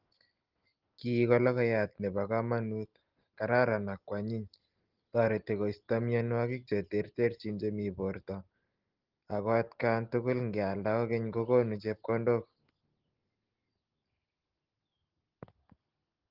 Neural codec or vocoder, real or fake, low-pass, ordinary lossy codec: none; real; 5.4 kHz; Opus, 16 kbps